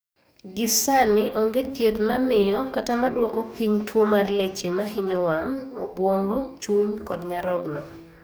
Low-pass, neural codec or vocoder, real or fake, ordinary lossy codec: none; codec, 44.1 kHz, 2.6 kbps, DAC; fake; none